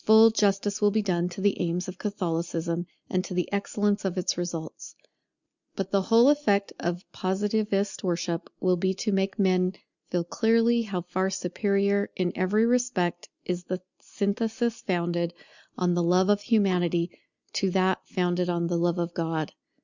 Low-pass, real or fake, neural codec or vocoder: 7.2 kHz; real; none